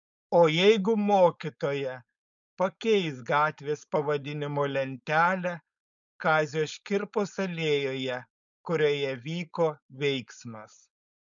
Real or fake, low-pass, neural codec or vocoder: fake; 7.2 kHz; codec, 16 kHz, 4.8 kbps, FACodec